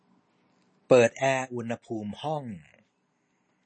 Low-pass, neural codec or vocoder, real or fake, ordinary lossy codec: 9.9 kHz; none; real; MP3, 32 kbps